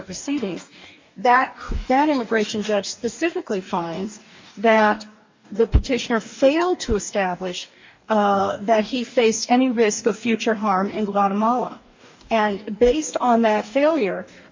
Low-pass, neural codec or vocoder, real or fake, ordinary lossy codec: 7.2 kHz; codec, 44.1 kHz, 2.6 kbps, DAC; fake; MP3, 48 kbps